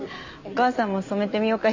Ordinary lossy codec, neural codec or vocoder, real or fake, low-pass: MP3, 64 kbps; none; real; 7.2 kHz